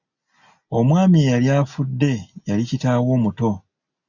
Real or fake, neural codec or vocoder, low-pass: real; none; 7.2 kHz